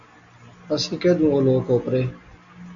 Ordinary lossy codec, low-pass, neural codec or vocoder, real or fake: MP3, 64 kbps; 7.2 kHz; none; real